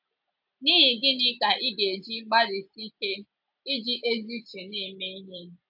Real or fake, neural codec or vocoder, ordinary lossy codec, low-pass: real; none; AAC, 48 kbps; 5.4 kHz